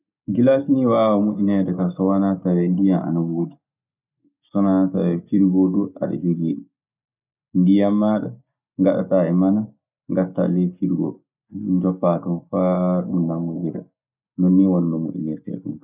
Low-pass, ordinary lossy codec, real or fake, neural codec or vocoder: 3.6 kHz; none; real; none